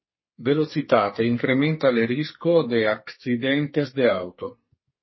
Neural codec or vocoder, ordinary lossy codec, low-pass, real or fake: codec, 44.1 kHz, 2.6 kbps, SNAC; MP3, 24 kbps; 7.2 kHz; fake